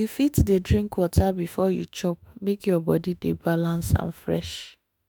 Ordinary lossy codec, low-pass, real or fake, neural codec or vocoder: none; none; fake; autoencoder, 48 kHz, 32 numbers a frame, DAC-VAE, trained on Japanese speech